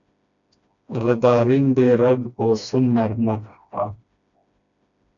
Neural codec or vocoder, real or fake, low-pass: codec, 16 kHz, 1 kbps, FreqCodec, smaller model; fake; 7.2 kHz